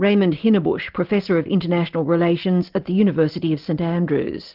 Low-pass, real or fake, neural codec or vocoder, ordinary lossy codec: 5.4 kHz; real; none; Opus, 32 kbps